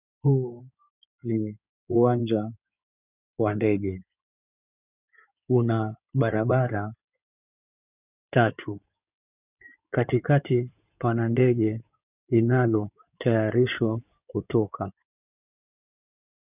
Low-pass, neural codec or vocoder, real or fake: 3.6 kHz; codec, 16 kHz in and 24 kHz out, 2.2 kbps, FireRedTTS-2 codec; fake